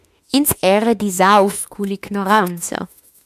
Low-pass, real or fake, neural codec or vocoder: 14.4 kHz; fake; autoencoder, 48 kHz, 32 numbers a frame, DAC-VAE, trained on Japanese speech